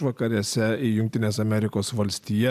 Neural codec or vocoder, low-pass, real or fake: none; 14.4 kHz; real